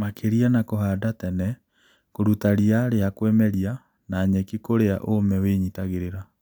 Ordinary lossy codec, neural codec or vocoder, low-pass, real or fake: none; none; none; real